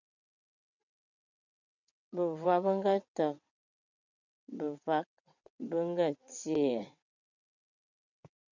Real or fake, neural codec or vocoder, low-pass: fake; vocoder, 22.05 kHz, 80 mel bands, WaveNeXt; 7.2 kHz